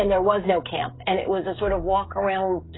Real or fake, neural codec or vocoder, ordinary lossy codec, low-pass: fake; codec, 16 kHz, 16 kbps, FreqCodec, smaller model; AAC, 16 kbps; 7.2 kHz